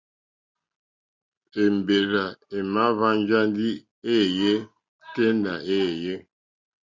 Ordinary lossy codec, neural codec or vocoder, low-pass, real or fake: Opus, 64 kbps; none; 7.2 kHz; real